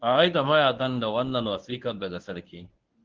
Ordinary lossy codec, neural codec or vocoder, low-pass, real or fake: Opus, 16 kbps; codec, 24 kHz, 0.9 kbps, WavTokenizer, medium speech release version 1; 7.2 kHz; fake